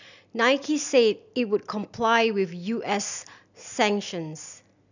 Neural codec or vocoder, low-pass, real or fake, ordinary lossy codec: none; 7.2 kHz; real; none